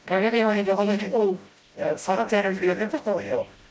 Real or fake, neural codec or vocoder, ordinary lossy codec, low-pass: fake; codec, 16 kHz, 0.5 kbps, FreqCodec, smaller model; none; none